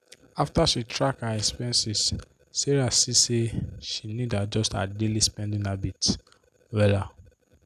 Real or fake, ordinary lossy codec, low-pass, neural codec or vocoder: real; none; 14.4 kHz; none